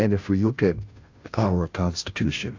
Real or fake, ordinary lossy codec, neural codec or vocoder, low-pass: fake; AAC, 48 kbps; codec, 16 kHz, 0.5 kbps, FunCodec, trained on Chinese and English, 25 frames a second; 7.2 kHz